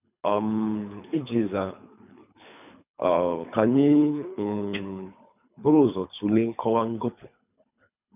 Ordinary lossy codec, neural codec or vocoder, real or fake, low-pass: none; codec, 24 kHz, 3 kbps, HILCodec; fake; 3.6 kHz